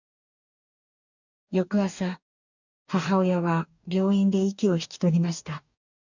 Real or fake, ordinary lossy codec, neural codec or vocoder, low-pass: fake; none; codec, 44.1 kHz, 2.6 kbps, DAC; 7.2 kHz